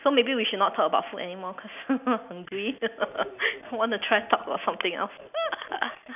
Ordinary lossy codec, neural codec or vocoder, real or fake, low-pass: none; none; real; 3.6 kHz